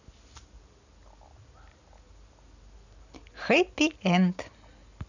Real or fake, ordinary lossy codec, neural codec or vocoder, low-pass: real; none; none; 7.2 kHz